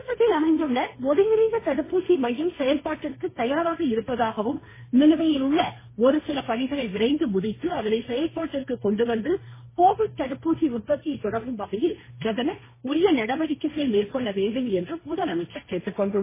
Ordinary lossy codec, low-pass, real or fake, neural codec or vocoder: MP3, 16 kbps; 3.6 kHz; fake; codec, 16 kHz, 1.1 kbps, Voila-Tokenizer